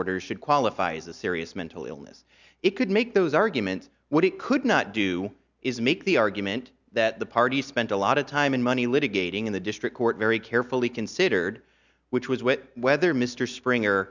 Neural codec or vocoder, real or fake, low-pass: none; real; 7.2 kHz